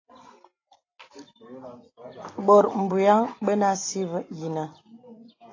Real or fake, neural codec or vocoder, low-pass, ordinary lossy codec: real; none; 7.2 kHz; AAC, 32 kbps